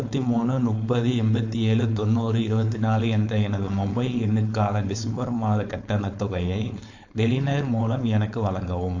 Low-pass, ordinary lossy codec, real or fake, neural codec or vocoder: 7.2 kHz; AAC, 48 kbps; fake; codec, 16 kHz, 4.8 kbps, FACodec